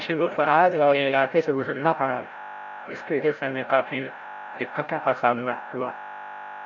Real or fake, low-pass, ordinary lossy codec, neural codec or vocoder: fake; 7.2 kHz; none; codec, 16 kHz, 0.5 kbps, FreqCodec, larger model